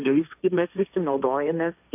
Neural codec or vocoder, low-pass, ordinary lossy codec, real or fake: codec, 16 kHz, 1.1 kbps, Voila-Tokenizer; 3.6 kHz; AAC, 32 kbps; fake